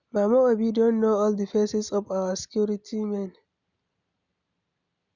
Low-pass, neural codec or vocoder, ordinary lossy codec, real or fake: 7.2 kHz; none; none; real